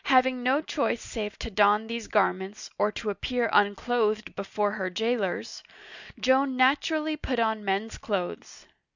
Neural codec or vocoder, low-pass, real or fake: none; 7.2 kHz; real